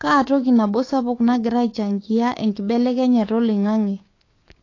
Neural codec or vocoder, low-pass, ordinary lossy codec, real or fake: codec, 16 kHz, 6 kbps, DAC; 7.2 kHz; AAC, 32 kbps; fake